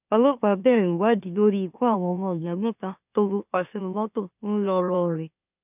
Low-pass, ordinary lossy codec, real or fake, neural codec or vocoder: 3.6 kHz; none; fake; autoencoder, 44.1 kHz, a latent of 192 numbers a frame, MeloTTS